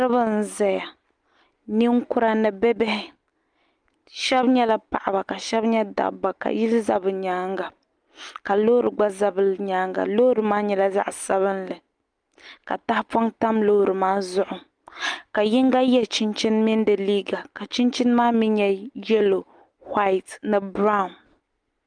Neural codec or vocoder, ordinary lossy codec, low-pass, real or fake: none; Opus, 32 kbps; 9.9 kHz; real